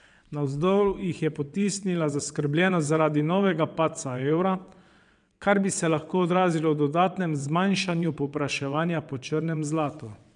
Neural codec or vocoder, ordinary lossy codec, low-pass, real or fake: vocoder, 22.05 kHz, 80 mel bands, WaveNeXt; none; 9.9 kHz; fake